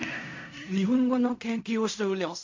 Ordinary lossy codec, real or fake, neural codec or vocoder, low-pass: MP3, 48 kbps; fake; codec, 16 kHz in and 24 kHz out, 0.4 kbps, LongCat-Audio-Codec, fine tuned four codebook decoder; 7.2 kHz